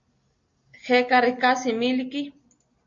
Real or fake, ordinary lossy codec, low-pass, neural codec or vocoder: real; MP3, 48 kbps; 7.2 kHz; none